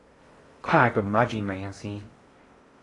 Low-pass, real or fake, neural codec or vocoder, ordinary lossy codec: 10.8 kHz; fake; codec, 16 kHz in and 24 kHz out, 0.6 kbps, FocalCodec, streaming, 2048 codes; AAC, 32 kbps